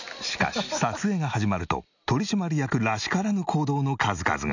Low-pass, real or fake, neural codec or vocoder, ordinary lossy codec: 7.2 kHz; real; none; none